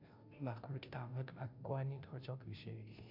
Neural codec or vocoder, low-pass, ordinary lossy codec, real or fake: codec, 16 kHz, 0.5 kbps, FunCodec, trained on Chinese and English, 25 frames a second; 5.4 kHz; MP3, 48 kbps; fake